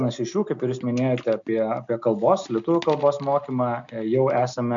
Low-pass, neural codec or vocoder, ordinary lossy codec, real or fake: 7.2 kHz; none; MP3, 64 kbps; real